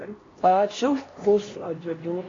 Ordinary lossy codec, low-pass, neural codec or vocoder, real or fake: AAC, 32 kbps; 7.2 kHz; codec, 16 kHz, 1 kbps, X-Codec, HuBERT features, trained on LibriSpeech; fake